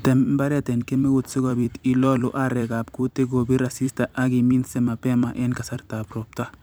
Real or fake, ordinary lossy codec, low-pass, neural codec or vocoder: fake; none; none; vocoder, 44.1 kHz, 128 mel bands every 256 samples, BigVGAN v2